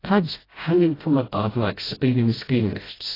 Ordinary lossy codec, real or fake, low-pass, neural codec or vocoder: AAC, 24 kbps; fake; 5.4 kHz; codec, 16 kHz, 0.5 kbps, FreqCodec, smaller model